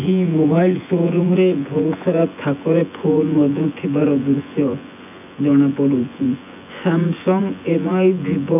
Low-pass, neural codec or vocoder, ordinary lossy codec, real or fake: 3.6 kHz; vocoder, 24 kHz, 100 mel bands, Vocos; none; fake